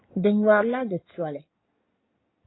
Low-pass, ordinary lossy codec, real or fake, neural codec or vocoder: 7.2 kHz; AAC, 16 kbps; fake; codec, 16 kHz in and 24 kHz out, 2.2 kbps, FireRedTTS-2 codec